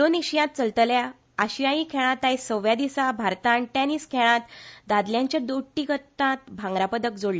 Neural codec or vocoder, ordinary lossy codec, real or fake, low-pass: none; none; real; none